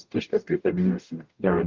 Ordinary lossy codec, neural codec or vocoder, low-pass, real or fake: Opus, 32 kbps; codec, 44.1 kHz, 0.9 kbps, DAC; 7.2 kHz; fake